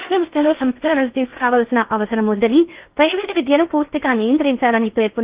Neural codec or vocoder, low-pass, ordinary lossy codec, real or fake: codec, 16 kHz in and 24 kHz out, 0.8 kbps, FocalCodec, streaming, 65536 codes; 3.6 kHz; Opus, 32 kbps; fake